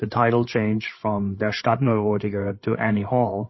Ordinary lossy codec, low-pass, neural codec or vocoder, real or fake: MP3, 24 kbps; 7.2 kHz; codec, 16 kHz, 8 kbps, FreqCodec, larger model; fake